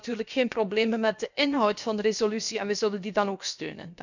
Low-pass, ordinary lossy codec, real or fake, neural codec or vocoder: 7.2 kHz; none; fake; codec, 16 kHz, about 1 kbps, DyCAST, with the encoder's durations